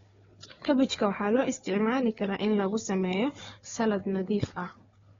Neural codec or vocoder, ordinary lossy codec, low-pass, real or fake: codec, 16 kHz, 4 kbps, FunCodec, trained on Chinese and English, 50 frames a second; AAC, 24 kbps; 7.2 kHz; fake